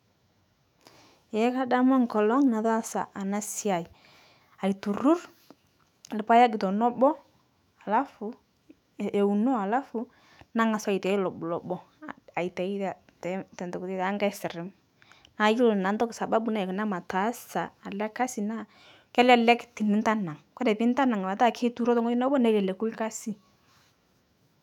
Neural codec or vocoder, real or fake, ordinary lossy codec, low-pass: autoencoder, 48 kHz, 128 numbers a frame, DAC-VAE, trained on Japanese speech; fake; none; 19.8 kHz